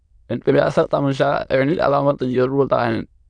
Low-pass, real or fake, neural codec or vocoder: 9.9 kHz; fake; autoencoder, 22.05 kHz, a latent of 192 numbers a frame, VITS, trained on many speakers